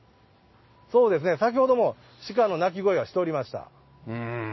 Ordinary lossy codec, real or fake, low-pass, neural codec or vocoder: MP3, 24 kbps; real; 7.2 kHz; none